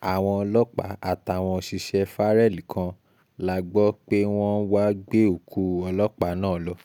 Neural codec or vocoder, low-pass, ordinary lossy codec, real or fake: none; 19.8 kHz; none; real